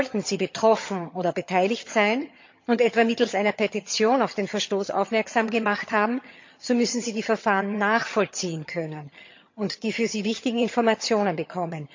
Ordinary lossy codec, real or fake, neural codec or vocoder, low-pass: MP3, 48 kbps; fake; vocoder, 22.05 kHz, 80 mel bands, HiFi-GAN; 7.2 kHz